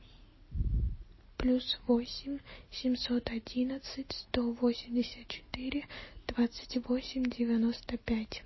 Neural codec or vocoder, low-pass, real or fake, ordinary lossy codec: none; 7.2 kHz; real; MP3, 24 kbps